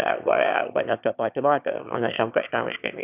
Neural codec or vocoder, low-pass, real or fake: autoencoder, 22.05 kHz, a latent of 192 numbers a frame, VITS, trained on one speaker; 3.6 kHz; fake